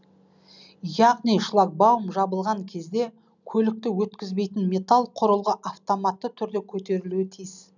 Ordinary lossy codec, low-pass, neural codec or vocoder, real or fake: none; 7.2 kHz; none; real